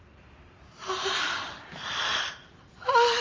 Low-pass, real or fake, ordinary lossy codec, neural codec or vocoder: 7.2 kHz; fake; Opus, 32 kbps; vocoder, 44.1 kHz, 128 mel bands every 512 samples, BigVGAN v2